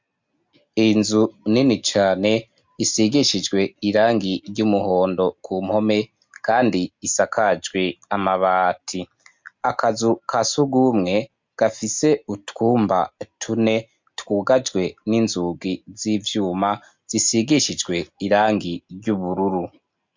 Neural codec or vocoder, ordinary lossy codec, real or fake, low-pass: none; MP3, 64 kbps; real; 7.2 kHz